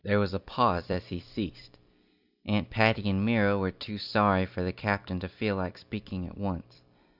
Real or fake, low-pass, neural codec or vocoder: fake; 5.4 kHz; vocoder, 44.1 kHz, 128 mel bands every 256 samples, BigVGAN v2